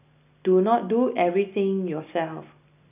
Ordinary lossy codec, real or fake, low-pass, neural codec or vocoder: none; real; 3.6 kHz; none